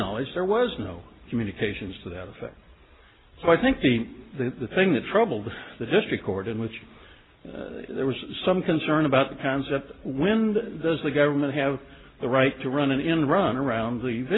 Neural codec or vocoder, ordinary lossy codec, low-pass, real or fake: none; AAC, 16 kbps; 7.2 kHz; real